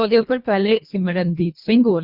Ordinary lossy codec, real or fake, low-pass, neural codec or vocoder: Opus, 64 kbps; fake; 5.4 kHz; codec, 24 kHz, 1.5 kbps, HILCodec